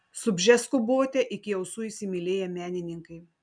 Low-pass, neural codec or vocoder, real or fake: 9.9 kHz; none; real